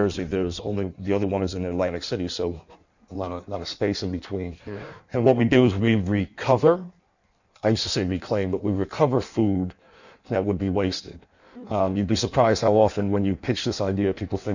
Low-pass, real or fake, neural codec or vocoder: 7.2 kHz; fake; codec, 16 kHz in and 24 kHz out, 1.1 kbps, FireRedTTS-2 codec